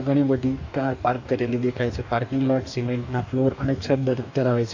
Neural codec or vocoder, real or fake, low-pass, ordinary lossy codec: codec, 44.1 kHz, 2.6 kbps, DAC; fake; 7.2 kHz; AAC, 48 kbps